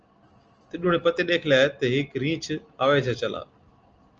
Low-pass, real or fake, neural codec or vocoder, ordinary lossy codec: 7.2 kHz; real; none; Opus, 24 kbps